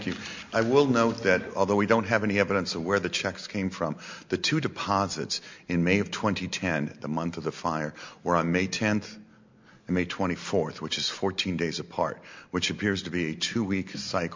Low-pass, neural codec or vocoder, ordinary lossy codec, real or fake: 7.2 kHz; none; MP3, 64 kbps; real